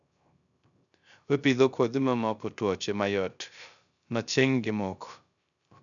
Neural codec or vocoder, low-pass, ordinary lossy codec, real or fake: codec, 16 kHz, 0.3 kbps, FocalCodec; 7.2 kHz; none; fake